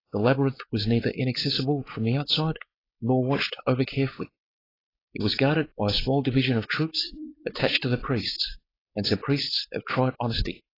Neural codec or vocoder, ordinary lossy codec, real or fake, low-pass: codec, 16 kHz, 4.8 kbps, FACodec; AAC, 24 kbps; fake; 5.4 kHz